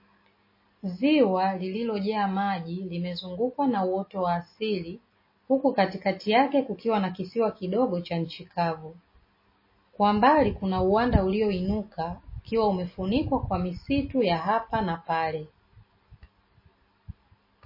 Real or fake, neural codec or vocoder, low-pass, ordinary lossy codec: real; none; 5.4 kHz; MP3, 24 kbps